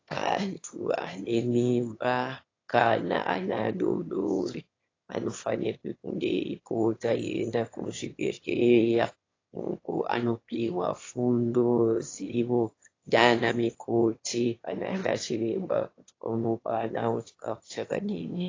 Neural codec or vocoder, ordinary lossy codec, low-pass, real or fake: autoencoder, 22.05 kHz, a latent of 192 numbers a frame, VITS, trained on one speaker; AAC, 32 kbps; 7.2 kHz; fake